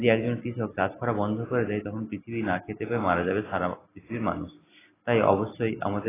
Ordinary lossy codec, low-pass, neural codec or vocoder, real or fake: AAC, 16 kbps; 3.6 kHz; none; real